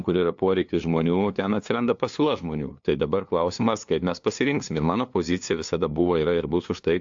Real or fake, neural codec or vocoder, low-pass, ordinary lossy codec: fake; codec, 16 kHz, 2 kbps, FunCodec, trained on LibriTTS, 25 frames a second; 7.2 kHz; AAC, 64 kbps